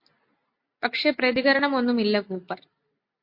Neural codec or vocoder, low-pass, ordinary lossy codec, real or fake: none; 5.4 kHz; MP3, 32 kbps; real